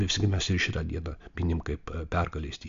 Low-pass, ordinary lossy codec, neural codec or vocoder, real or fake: 7.2 kHz; MP3, 64 kbps; none; real